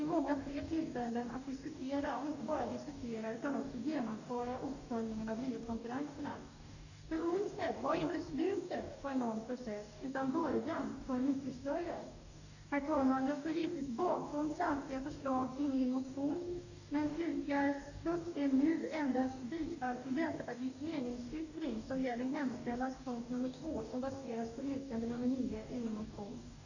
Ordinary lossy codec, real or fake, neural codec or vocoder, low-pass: none; fake; codec, 44.1 kHz, 2.6 kbps, DAC; 7.2 kHz